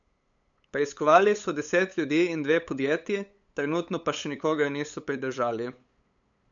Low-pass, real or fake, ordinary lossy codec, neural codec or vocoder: 7.2 kHz; fake; none; codec, 16 kHz, 8 kbps, FunCodec, trained on LibriTTS, 25 frames a second